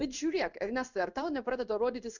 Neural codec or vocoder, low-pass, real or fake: codec, 16 kHz in and 24 kHz out, 1 kbps, XY-Tokenizer; 7.2 kHz; fake